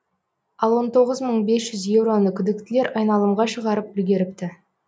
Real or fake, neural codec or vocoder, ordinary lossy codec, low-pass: real; none; none; none